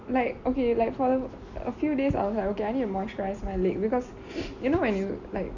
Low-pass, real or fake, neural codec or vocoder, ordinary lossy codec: 7.2 kHz; real; none; none